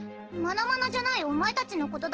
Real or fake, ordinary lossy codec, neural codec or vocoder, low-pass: real; Opus, 16 kbps; none; 7.2 kHz